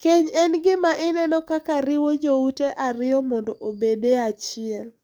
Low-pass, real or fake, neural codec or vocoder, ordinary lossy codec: none; fake; codec, 44.1 kHz, 7.8 kbps, DAC; none